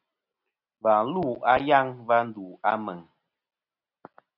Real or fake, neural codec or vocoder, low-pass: real; none; 5.4 kHz